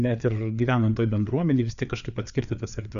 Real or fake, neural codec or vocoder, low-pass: fake; codec, 16 kHz, 4 kbps, FreqCodec, larger model; 7.2 kHz